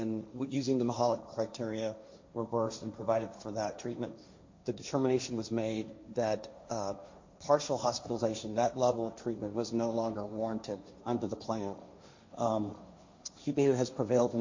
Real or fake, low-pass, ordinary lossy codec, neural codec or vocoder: fake; 7.2 kHz; MP3, 48 kbps; codec, 16 kHz, 1.1 kbps, Voila-Tokenizer